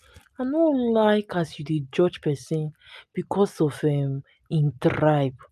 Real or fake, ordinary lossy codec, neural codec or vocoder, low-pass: real; AAC, 96 kbps; none; 14.4 kHz